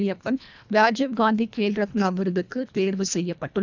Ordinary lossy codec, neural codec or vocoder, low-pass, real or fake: none; codec, 24 kHz, 1.5 kbps, HILCodec; 7.2 kHz; fake